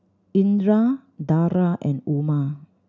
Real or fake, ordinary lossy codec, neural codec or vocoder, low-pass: real; none; none; 7.2 kHz